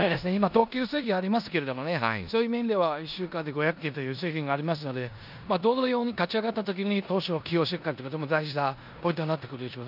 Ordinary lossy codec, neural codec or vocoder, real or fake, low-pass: none; codec, 16 kHz in and 24 kHz out, 0.9 kbps, LongCat-Audio-Codec, four codebook decoder; fake; 5.4 kHz